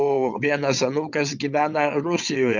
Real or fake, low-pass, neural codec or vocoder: fake; 7.2 kHz; vocoder, 22.05 kHz, 80 mel bands, WaveNeXt